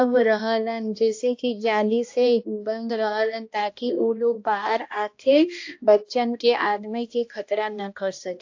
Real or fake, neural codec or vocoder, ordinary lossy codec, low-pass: fake; codec, 16 kHz, 1 kbps, X-Codec, HuBERT features, trained on balanced general audio; AAC, 48 kbps; 7.2 kHz